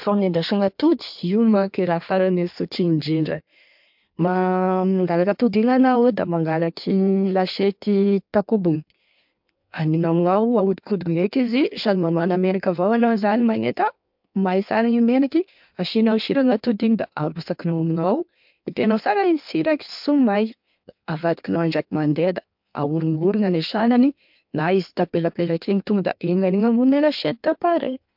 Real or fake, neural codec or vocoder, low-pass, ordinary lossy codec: fake; codec, 16 kHz in and 24 kHz out, 1.1 kbps, FireRedTTS-2 codec; 5.4 kHz; MP3, 48 kbps